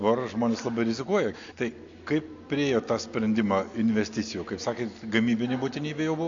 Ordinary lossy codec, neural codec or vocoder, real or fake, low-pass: AAC, 48 kbps; none; real; 7.2 kHz